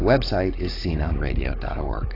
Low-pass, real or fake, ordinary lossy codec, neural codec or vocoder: 5.4 kHz; fake; AAC, 24 kbps; codec, 16 kHz, 16 kbps, FunCodec, trained on Chinese and English, 50 frames a second